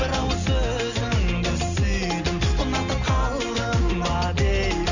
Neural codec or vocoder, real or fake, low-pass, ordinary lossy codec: none; real; 7.2 kHz; none